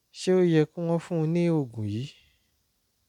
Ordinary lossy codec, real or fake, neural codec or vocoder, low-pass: none; real; none; 19.8 kHz